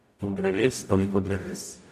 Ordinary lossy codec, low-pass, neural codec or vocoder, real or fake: none; 14.4 kHz; codec, 44.1 kHz, 0.9 kbps, DAC; fake